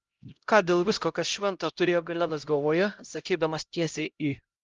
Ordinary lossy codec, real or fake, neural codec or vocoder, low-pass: Opus, 32 kbps; fake; codec, 16 kHz, 0.5 kbps, X-Codec, HuBERT features, trained on LibriSpeech; 7.2 kHz